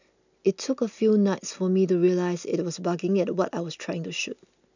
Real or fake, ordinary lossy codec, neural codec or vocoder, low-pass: real; none; none; 7.2 kHz